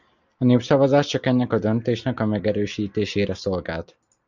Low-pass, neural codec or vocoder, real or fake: 7.2 kHz; vocoder, 44.1 kHz, 128 mel bands every 256 samples, BigVGAN v2; fake